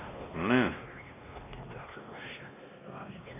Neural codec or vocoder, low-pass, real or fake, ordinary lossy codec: codec, 16 kHz, 1 kbps, X-Codec, WavLM features, trained on Multilingual LibriSpeech; 3.6 kHz; fake; none